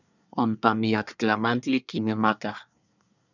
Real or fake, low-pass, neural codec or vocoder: fake; 7.2 kHz; codec, 24 kHz, 1 kbps, SNAC